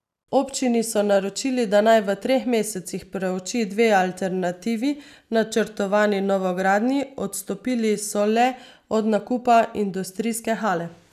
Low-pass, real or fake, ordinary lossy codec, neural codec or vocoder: 14.4 kHz; real; none; none